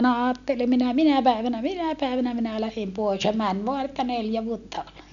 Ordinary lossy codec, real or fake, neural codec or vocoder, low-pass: none; real; none; 7.2 kHz